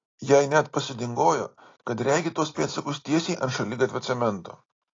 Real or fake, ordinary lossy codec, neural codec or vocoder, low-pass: real; AAC, 32 kbps; none; 7.2 kHz